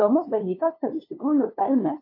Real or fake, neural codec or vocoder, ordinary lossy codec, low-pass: fake; codec, 16 kHz, 2 kbps, FunCodec, trained on LibriTTS, 25 frames a second; AAC, 32 kbps; 5.4 kHz